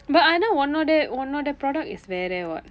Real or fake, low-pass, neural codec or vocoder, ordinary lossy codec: real; none; none; none